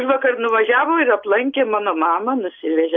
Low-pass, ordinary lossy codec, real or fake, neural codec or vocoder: 7.2 kHz; MP3, 48 kbps; real; none